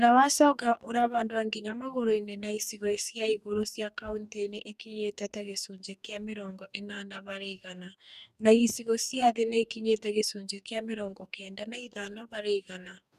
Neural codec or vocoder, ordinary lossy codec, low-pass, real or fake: codec, 44.1 kHz, 2.6 kbps, DAC; none; 14.4 kHz; fake